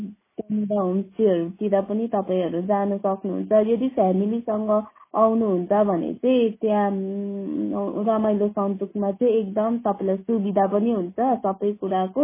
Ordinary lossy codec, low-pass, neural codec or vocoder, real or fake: MP3, 16 kbps; 3.6 kHz; none; real